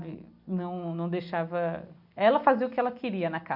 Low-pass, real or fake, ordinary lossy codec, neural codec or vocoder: 5.4 kHz; real; none; none